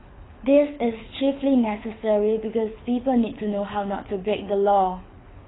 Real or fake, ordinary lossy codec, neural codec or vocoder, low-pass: fake; AAC, 16 kbps; codec, 24 kHz, 6 kbps, HILCodec; 7.2 kHz